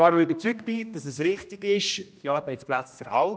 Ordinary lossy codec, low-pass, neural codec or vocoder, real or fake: none; none; codec, 16 kHz, 1 kbps, X-Codec, HuBERT features, trained on general audio; fake